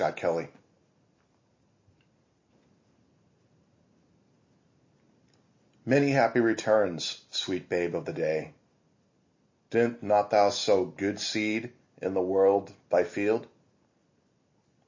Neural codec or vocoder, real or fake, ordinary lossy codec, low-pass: none; real; MP3, 32 kbps; 7.2 kHz